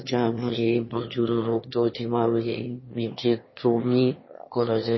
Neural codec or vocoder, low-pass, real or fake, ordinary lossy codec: autoencoder, 22.05 kHz, a latent of 192 numbers a frame, VITS, trained on one speaker; 7.2 kHz; fake; MP3, 24 kbps